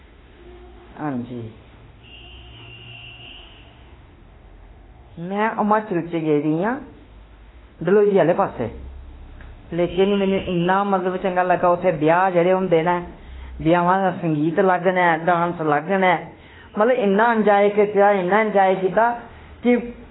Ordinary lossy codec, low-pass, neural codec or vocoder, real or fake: AAC, 16 kbps; 7.2 kHz; autoencoder, 48 kHz, 32 numbers a frame, DAC-VAE, trained on Japanese speech; fake